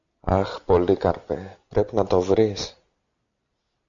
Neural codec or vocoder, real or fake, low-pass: none; real; 7.2 kHz